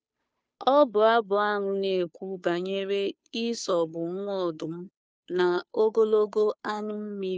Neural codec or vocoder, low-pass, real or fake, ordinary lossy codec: codec, 16 kHz, 2 kbps, FunCodec, trained on Chinese and English, 25 frames a second; none; fake; none